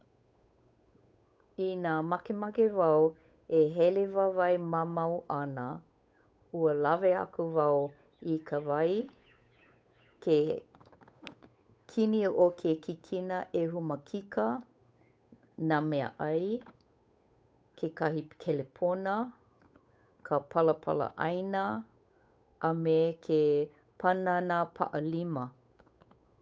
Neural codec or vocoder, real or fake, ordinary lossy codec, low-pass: codec, 16 kHz, 8 kbps, FunCodec, trained on Chinese and English, 25 frames a second; fake; none; none